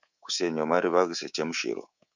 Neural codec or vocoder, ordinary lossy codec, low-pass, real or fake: codec, 24 kHz, 3.1 kbps, DualCodec; Opus, 64 kbps; 7.2 kHz; fake